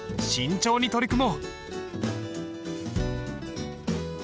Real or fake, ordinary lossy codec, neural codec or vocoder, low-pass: real; none; none; none